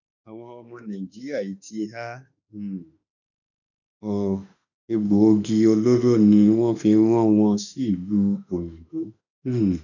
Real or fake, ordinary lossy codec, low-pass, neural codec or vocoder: fake; none; 7.2 kHz; autoencoder, 48 kHz, 32 numbers a frame, DAC-VAE, trained on Japanese speech